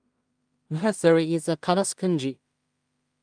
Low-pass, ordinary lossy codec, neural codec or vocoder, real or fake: 9.9 kHz; Opus, 32 kbps; codec, 16 kHz in and 24 kHz out, 0.4 kbps, LongCat-Audio-Codec, two codebook decoder; fake